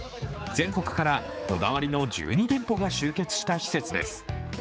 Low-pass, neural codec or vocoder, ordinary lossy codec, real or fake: none; codec, 16 kHz, 4 kbps, X-Codec, HuBERT features, trained on general audio; none; fake